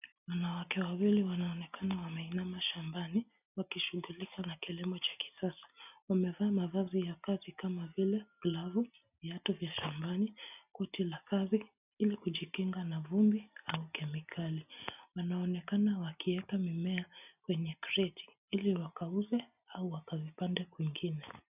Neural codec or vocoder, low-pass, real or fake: none; 3.6 kHz; real